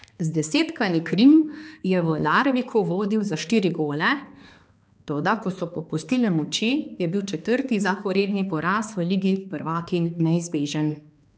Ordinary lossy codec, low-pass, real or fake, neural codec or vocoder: none; none; fake; codec, 16 kHz, 2 kbps, X-Codec, HuBERT features, trained on balanced general audio